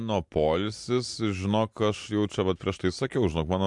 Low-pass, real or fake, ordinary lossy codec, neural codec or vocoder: 10.8 kHz; real; MP3, 64 kbps; none